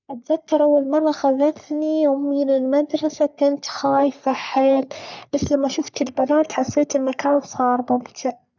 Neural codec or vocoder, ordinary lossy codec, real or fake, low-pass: codec, 44.1 kHz, 3.4 kbps, Pupu-Codec; none; fake; 7.2 kHz